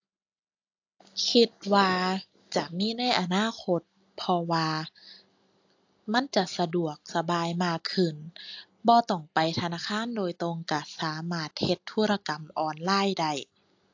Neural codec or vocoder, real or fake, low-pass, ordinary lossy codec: none; real; 7.2 kHz; AAC, 48 kbps